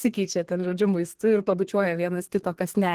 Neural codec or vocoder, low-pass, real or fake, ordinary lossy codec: codec, 32 kHz, 1.9 kbps, SNAC; 14.4 kHz; fake; Opus, 16 kbps